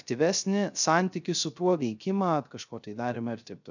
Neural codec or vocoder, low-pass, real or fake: codec, 16 kHz, 0.3 kbps, FocalCodec; 7.2 kHz; fake